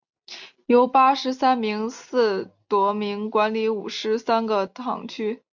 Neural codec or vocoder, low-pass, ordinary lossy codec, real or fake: none; 7.2 kHz; MP3, 64 kbps; real